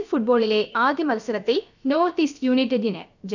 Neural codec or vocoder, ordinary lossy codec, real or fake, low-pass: codec, 16 kHz, about 1 kbps, DyCAST, with the encoder's durations; none; fake; 7.2 kHz